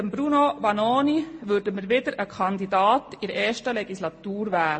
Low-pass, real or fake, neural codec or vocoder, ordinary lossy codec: 9.9 kHz; real; none; MP3, 32 kbps